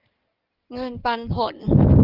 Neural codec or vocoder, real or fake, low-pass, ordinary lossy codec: codec, 16 kHz in and 24 kHz out, 2.2 kbps, FireRedTTS-2 codec; fake; 5.4 kHz; Opus, 32 kbps